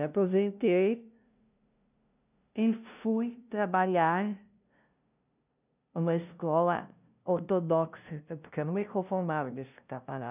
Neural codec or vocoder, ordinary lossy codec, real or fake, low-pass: codec, 16 kHz, 0.5 kbps, FunCodec, trained on LibriTTS, 25 frames a second; none; fake; 3.6 kHz